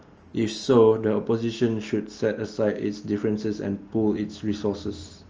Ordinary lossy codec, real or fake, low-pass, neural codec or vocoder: Opus, 24 kbps; real; 7.2 kHz; none